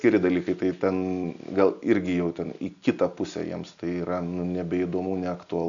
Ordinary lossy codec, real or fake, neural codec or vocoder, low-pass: MP3, 96 kbps; real; none; 7.2 kHz